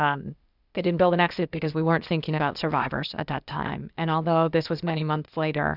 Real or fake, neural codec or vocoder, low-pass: fake; codec, 16 kHz, 0.8 kbps, ZipCodec; 5.4 kHz